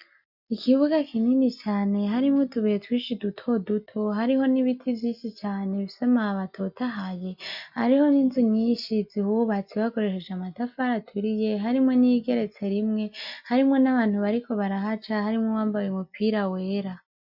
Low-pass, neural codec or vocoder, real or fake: 5.4 kHz; none; real